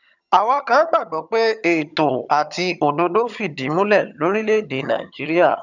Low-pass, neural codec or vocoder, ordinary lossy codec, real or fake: 7.2 kHz; vocoder, 22.05 kHz, 80 mel bands, HiFi-GAN; none; fake